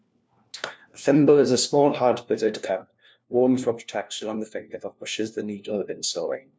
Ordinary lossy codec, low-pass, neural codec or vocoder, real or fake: none; none; codec, 16 kHz, 1 kbps, FunCodec, trained on LibriTTS, 50 frames a second; fake